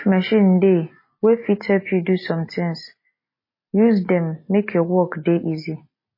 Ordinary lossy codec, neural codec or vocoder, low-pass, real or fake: MP3, 24 kbps; none; 5.4 kHz; real